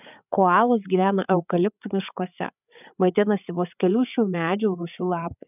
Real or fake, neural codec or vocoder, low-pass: fake; codec, 16 kHz, 8 kbps, FreqCodec, larger model; 3.6 kHz